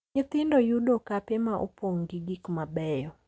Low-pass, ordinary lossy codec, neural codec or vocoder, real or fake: none; none; none; real